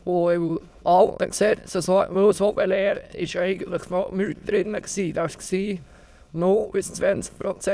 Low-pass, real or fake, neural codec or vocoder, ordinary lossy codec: none; fake; autoencoder, 22.05 kHz, a latent of 192 numbers a frame, VITS, trained on many speakers; none